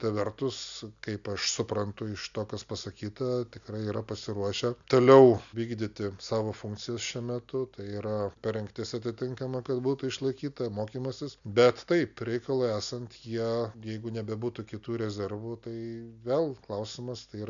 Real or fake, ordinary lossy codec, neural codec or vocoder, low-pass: real; MP3, 96 kbps; none; 7.2 kHz